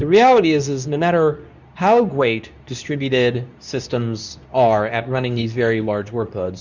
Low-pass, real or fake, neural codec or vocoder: 7.2 kHz; fake; codec, 24 kHz, 0.9 kbps, WavTokenizer, medium speech release version 2